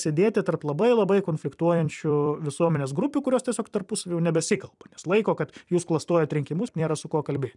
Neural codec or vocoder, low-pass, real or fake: vocoder, 44.1 kHz, 128 mel bands every 256 samples, BigVGAN v2; 10.8 kHz; fake